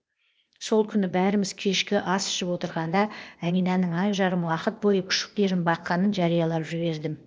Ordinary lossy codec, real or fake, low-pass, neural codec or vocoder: none; fake; none; codec, 16 kHz, 0.8 kbps, ZipCodec